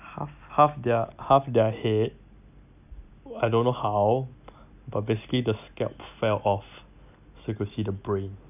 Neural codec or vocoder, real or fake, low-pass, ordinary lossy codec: none; real; 3.6 kHz; none